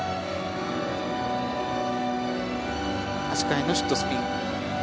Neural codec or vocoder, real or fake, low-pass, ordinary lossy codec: none; real; none; none